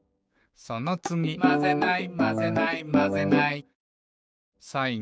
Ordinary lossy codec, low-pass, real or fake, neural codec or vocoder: none; none; fake; codec, 16 kHz, 6 kbps, DAC